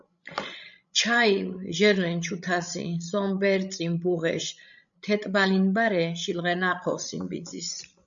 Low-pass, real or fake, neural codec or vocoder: 7.2 kHz; fake; codec, 16 kHz, 16 kbps, FreqCodec, larger model